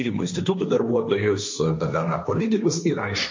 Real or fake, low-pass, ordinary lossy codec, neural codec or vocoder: fake; 7.2 kHz; MP3, 48 kbps; codec, 16 kHz, 1.1 kbps, Voila-Tokenizer